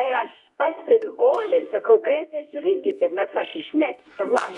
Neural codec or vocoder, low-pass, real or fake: codec, 24 kHz, 0.9 kbps, WavTokenizer, medium music audio release; 10.8 kHz; fake